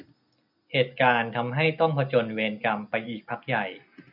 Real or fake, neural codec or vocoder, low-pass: real; none; 5.4 kHz